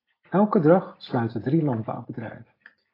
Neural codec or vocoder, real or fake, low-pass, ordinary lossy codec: none; real; 5.4 kHz; AAC, 24 kbps